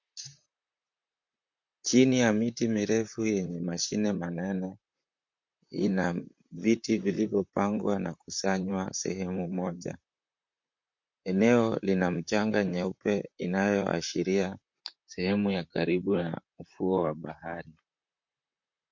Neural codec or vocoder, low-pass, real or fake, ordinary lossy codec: vocoder, 44.1 kHz, 128 mel bands, Pupu-Vocoder; 7.2 kHz; fake; MP3, 48 kbps